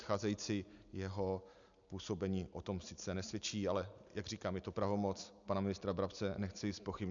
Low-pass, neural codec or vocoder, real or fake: 7.2 kHz; none; real